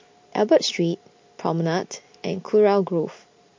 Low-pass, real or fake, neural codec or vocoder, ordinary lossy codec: 7.2 kHz; real; none; MP3, 48 kbps